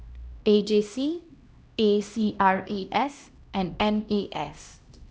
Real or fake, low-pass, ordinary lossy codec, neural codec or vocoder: fake; none; none; codec, 16 kHz, 1 kbps, X-Codec, HuBERT features, trained on LibriSpeech